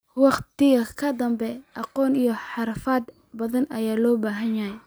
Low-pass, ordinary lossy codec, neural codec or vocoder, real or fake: none; none; none; real